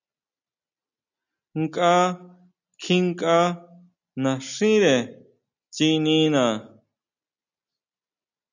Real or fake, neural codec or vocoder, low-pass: real; none; 7.2 kHz